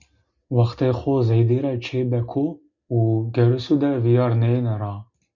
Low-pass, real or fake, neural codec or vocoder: 7.2 kHz; real; none